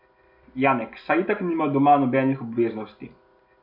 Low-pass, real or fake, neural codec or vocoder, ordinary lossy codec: 5.4 kHz; real; none; MP3, 48 kbps